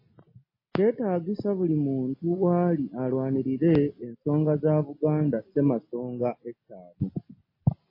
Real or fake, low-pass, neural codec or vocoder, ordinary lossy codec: real; 5.4 kHz; none; MP3, 24 kbps